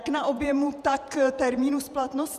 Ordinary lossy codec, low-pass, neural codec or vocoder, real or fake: Opus, 64 kbps; 14.4 kHz; vocoder, 48 kHz, 128 mel bands, Vocos; fake